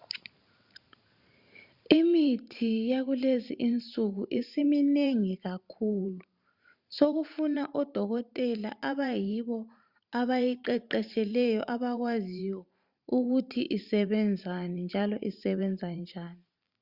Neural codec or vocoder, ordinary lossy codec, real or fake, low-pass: vocoder, 44.1 kHz, 128 mel bands every 512 samples, BigVGAN v2; Opus, 64 kbps; fake; 5.4 kHz